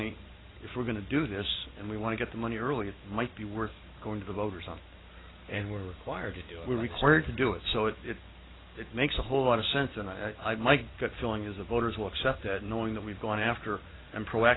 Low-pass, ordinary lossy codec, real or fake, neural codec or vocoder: 7.2 kHz; AAC, 16 kbps; real; none